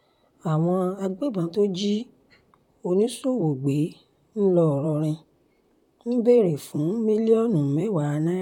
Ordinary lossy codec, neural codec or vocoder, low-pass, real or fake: none; vocoder, 44.1 kHz, 128 mel bands, Pupu-Vocoder; 19.8 kHz; fake